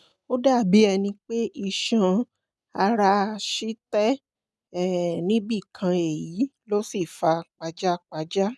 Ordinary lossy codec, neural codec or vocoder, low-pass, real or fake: none; none; none; real